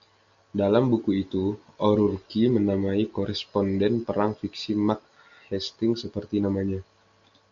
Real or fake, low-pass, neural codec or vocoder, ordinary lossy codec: real; 7.2 kHz; none; MP3, 96 kbps